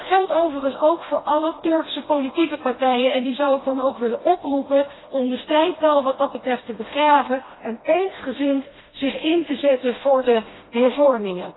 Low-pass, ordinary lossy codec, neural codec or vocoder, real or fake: 7.2 kHz; AAC, 16 kbps; codec, 16 kHz, 1 kbps, FreqCodec, smaller model; fake